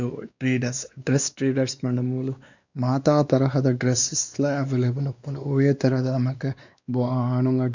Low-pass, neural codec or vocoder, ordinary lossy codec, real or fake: 7.2 kHz; codec, 16 kHz, 2 kbps, X-Codec, WavLM features, trained on Multilingual LibriSpeech; none; fake